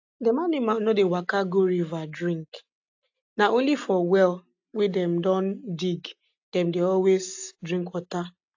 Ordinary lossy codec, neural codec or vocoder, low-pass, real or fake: AAC, 48 kbps; none; 7.2 kHz; real